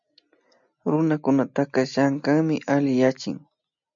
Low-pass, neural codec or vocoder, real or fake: 7.2 kHz; none; real